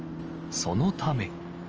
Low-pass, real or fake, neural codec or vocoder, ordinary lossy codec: 7.2 kHz; real; none; Opus, 24 kbps